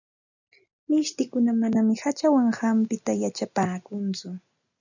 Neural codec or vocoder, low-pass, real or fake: none; 7.2 kHz; real